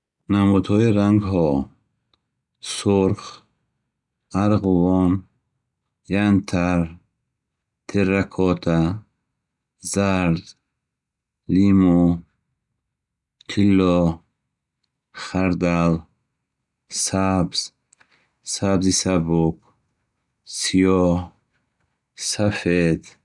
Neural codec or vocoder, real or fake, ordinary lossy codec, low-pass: none; real; none; 10.8 kHz